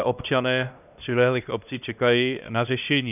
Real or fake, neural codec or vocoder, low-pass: fake; codec, 16 kHz, 1 kbps, X-Codec, HuBERT features, trained on LibriSpeech; 3.6 kHz